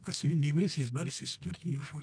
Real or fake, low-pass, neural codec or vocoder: fake; 9.9 kHz; codec, 24 kHz, 0.9 kbps, WavTokenizer, medium music audio release